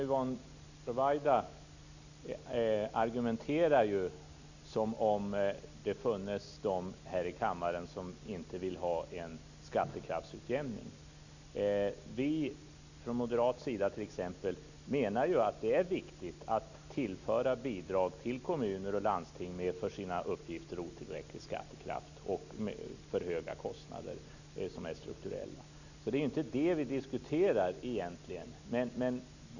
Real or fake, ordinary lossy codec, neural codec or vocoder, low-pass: real; none; none; 7.2 kHz